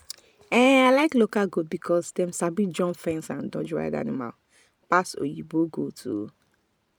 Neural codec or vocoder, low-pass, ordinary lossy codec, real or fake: vocoder, 44.1 kHz, 128 mel bands every 512 samples, BigVGAN v2; 19.8 kHz; none; fake